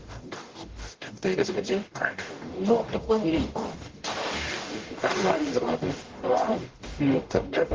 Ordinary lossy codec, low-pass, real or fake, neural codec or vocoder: Opus, 16 kbps; 7.2 kHz; fake; codec, 44.1 kHz, 0.9 kbps, DAC